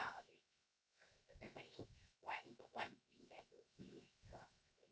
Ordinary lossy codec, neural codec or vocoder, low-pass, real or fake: none; codec, 16 kHz, 0.7 kbps, FocalCodec; none; fake